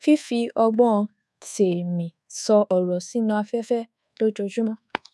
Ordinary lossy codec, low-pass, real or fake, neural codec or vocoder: none; none; fake; codec, 24 kHz, 1.2 kbps, DualCodec